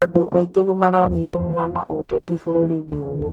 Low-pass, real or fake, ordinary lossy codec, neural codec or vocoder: 19.8 kHz; fake; none; codec, 44.1 kHz, 0.9 kbps, DAC